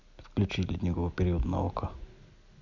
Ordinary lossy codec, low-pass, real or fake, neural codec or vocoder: none; 7.2 kHz; real; none